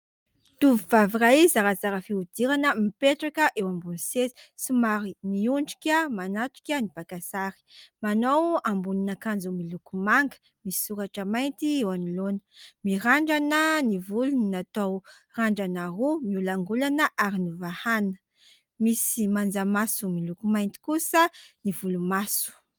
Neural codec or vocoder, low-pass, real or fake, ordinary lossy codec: none; 19.8 kHz; real; Opus, 32 kbps